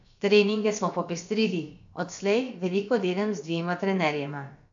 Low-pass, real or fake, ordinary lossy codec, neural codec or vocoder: 7.2 kHz; fake; AAC, 64 kbps; codec, 16 kHz, about 1 kbps, DyCAST, with the encoder's durations